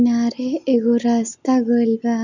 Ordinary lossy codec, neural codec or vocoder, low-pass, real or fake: none; none; 7.2 kHz; real